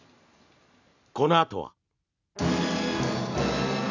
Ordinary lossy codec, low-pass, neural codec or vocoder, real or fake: none; 7.2 kHz; none; real